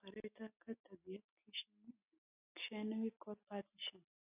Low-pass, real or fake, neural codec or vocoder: 3.6 kHz; real; none